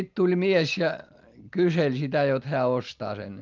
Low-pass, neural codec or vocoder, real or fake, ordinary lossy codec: 7.2 kHz; none; real; Opus, 32 kbps